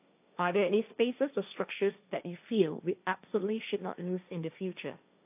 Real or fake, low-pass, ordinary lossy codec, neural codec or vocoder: fake; 3.6 kHz; AAC, 32 kbps; codec, 16 kHz, 1.1 kbps, Voila-Tokenizer